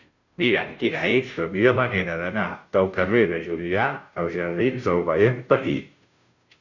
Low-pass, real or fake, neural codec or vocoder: 7.2 kHz; fake; codec, 16 kHz, 0.5 kbps, FunCodec, trained on Chinese and English, 25 frames a second